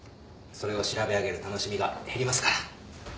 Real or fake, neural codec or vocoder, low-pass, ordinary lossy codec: real; none; none; none